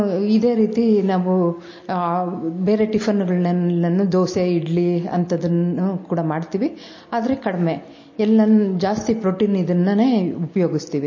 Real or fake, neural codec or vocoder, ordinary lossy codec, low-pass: real; none; MP3, 32 kbps; 7.2 kHz